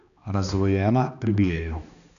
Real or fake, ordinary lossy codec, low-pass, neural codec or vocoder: fake; none; 7.2 kHz; codec, 16 kHz, 2 kbps, X-Codec, HuBERT features, trained on balanced general audio